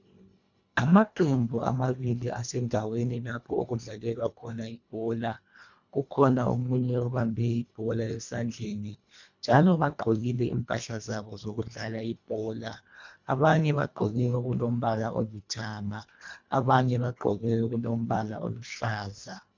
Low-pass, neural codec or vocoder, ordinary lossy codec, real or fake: 7.2 kHz; codec, 24 kHz, 1.5 kbps, HILCodec; MP3, 64 kbps; fake